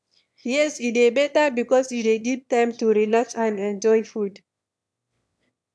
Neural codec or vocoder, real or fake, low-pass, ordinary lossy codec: autoencoder, 22.05 kHz, a latent of 192 numbers a frame, VITS, trained on one speaker; fake; none; none